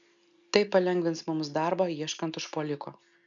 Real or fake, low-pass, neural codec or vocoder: real; 7.2 kHz; none